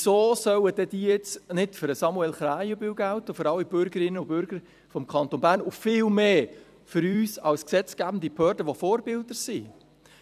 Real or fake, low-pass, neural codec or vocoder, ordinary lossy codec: fake; 14.4 kHz; vocoder, 44.1 kHz, 128 mel bands every 256 samples, BigVGAN v2; none